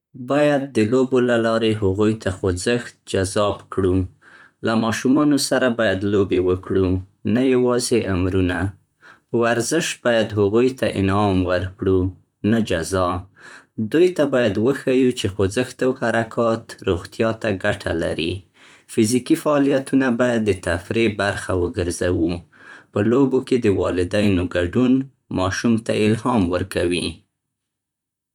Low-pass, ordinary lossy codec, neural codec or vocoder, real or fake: 19.8 kHz; none; vocoder, 44.1 kHz, 128 mel bands, Pupu-Vocoder; fake